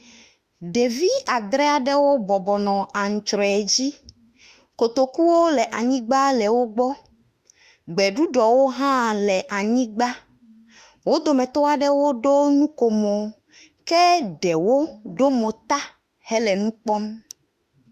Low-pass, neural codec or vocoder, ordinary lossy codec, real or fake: 14.4 kHz; autoencoder, 48 kHz, 32 numbers a frame, DAC-VAE, trained on Japanese speech; Opus, 64 kbps; fake